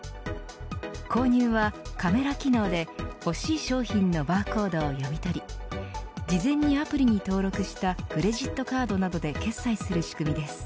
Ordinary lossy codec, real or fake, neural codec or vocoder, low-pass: none; real; none; none